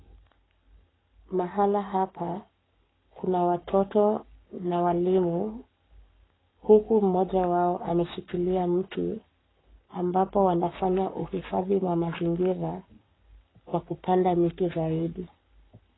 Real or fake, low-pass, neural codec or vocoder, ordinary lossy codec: fake; 7.2 kHz; codec, 44.1 kHz, 7.8 kbps, Pupu-Codec; AAC, 16 kbps